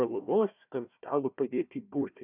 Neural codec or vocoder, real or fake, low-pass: codec, 16 kHz, 1 kbps, FunCodec, trained on Chinese and English, 50 frames a second; fake; 3.6 kHz